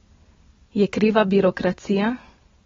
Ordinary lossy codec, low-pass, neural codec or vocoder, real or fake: AAC, 24 kbps; 7.2 kHz; none; real